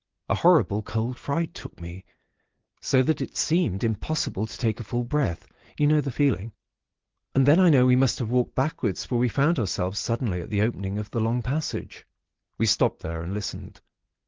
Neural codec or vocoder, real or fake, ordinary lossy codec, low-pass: none; real; Opus, 16 kbps; 7.2 kHz